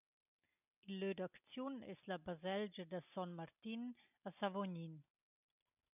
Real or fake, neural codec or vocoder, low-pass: real; none; 3.6 kHz